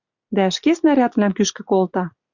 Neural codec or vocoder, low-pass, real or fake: none; 7.2 kHz; real